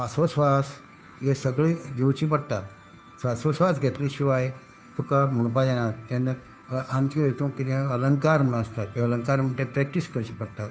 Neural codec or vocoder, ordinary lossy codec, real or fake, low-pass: codec, 16 kHz, 2 kbps, FunCodec, trained on Chinese and English, 25 frames a second; none; fake; none